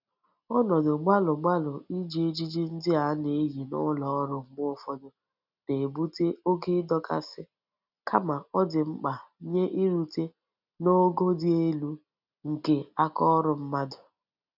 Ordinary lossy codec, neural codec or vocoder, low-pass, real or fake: none; none; 5.4 kHz; real